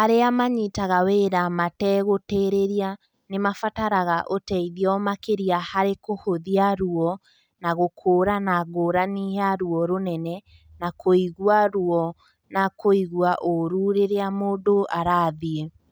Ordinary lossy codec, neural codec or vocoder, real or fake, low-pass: none; none; real; none